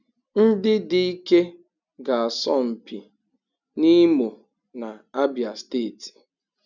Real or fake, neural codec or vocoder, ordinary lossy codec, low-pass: real; none; none; 7.2 kHz